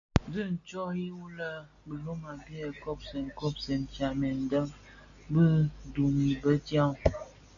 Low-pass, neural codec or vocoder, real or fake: 7.2 kHz; none; real